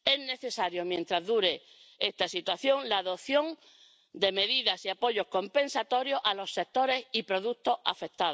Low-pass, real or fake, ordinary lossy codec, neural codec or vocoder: none; real; none; none